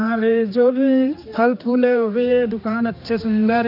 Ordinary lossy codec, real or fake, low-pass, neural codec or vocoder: none; fake; 5.4 kHz; codec, 16 kHz, 2 kbps, X-Codec, HuBERT features, trained on general audio